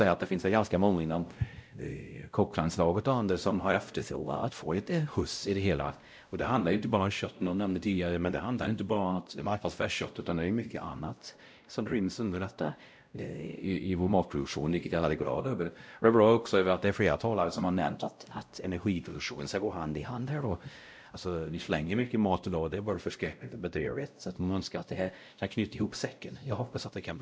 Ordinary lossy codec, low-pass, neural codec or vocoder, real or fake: none; none; codec, 16 kHz, 0.5 kbps, X-Codec, WavLM features, trained on Multilingual LibriSpeech; fake